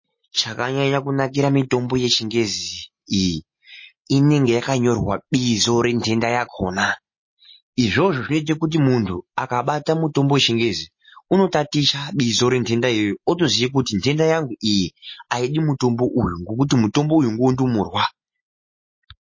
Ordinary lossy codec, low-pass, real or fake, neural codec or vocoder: MP3, 32 kbps; 7.2 kHz; real; none